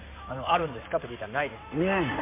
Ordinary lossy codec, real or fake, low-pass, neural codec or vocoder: MP3, 24 kbps; fake; 3.6 kHz; codec, 16 kHz in and 24 kHz out, 2.2 kbps, FireRedTTS-2 codec